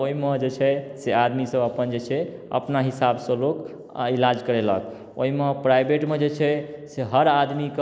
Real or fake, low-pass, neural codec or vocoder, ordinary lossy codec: real; none; none; none